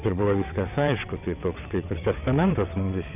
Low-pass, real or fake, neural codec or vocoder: 3.6 kHz; fake; vocoder, 22.05 kHz, 80 mel bands, WaveNeXt